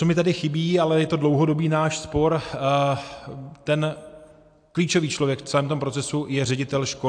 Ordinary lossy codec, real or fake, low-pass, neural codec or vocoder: AAC, 64 kbps; real; 9.9 kHz; none